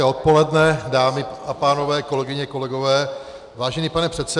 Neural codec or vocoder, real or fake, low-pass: none; real; 10.8 kHz